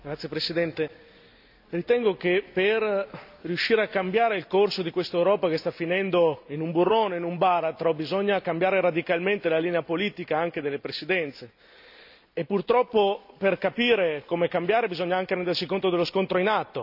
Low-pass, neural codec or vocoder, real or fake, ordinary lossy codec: 5.4 kHz; none; real; AAC, 48 kbps